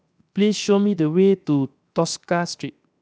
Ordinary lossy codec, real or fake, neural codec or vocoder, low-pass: none; fake; codec, 16 kHz, 0.7 kbps, FocalCodec; none